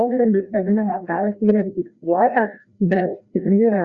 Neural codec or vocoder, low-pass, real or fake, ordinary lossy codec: codec, 16 kHz, 1 kbps, FreqCodec, larger model; 7.2 kHz; fake; Opus, 64 kbps